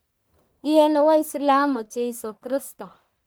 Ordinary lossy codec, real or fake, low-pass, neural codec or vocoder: none; fake; none; codec, 44.1 kHz, 1.7 kbps, Pupu-Codec